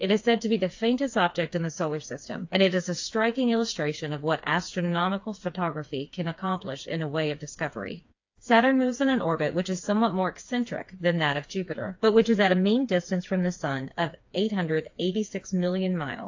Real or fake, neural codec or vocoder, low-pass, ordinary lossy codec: fake; codec, 16 kHz, 4 kbps, FreqCodec, smaller model; 7.2 kHz; AAC, 48 kbps